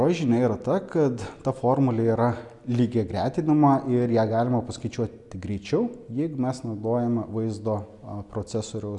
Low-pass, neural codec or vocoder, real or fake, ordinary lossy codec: 10.8 kHz; none; real; MP3, 96 kbps